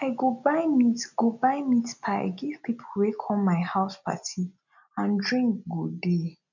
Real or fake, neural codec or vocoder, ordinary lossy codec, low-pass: real; none; none; 7.2 kHz